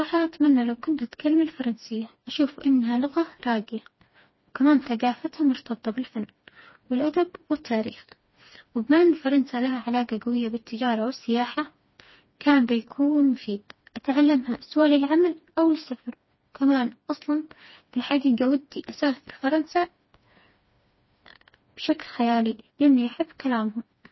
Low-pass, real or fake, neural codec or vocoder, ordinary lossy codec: 7.2 kHz; fake; codec, 16 kHz, 2 kbps, FreqCodec, smaller model; MP3, 24 kbps